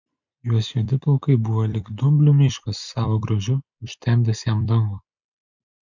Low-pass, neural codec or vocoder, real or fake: 7.2 kHz; vocoder, 44.1 kHz, 128 mel bands every 256 samples, BigVGAN v2; fake